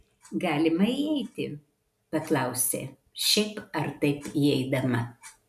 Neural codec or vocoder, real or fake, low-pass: none; real; 14.4 kHz